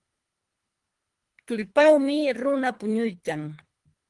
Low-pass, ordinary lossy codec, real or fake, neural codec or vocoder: 10.8 kHz; Opus, 24 kbps; fake; codec, 24 kHz, 3 kbps, HILCodec